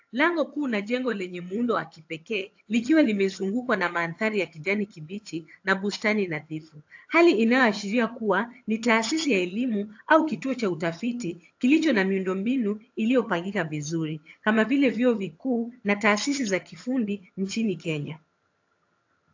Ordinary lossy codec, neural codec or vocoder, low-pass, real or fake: AAC, 48 kbps; vocoder, 22.05 kHz, 80 mel bands, HiFi-GAN; 7.2 kHz; fake